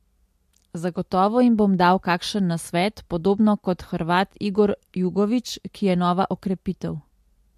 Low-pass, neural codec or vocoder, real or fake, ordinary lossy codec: 14.4 kHz; none; real; MP3, 64 kbps